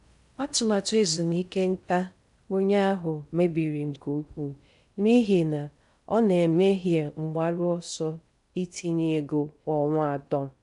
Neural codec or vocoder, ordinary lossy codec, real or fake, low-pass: codec, 16 kHz in and 24 kHz out, 0.6 kbps, FocalCodec, streaming, 4096 codes; none; fake; 10.8 kHz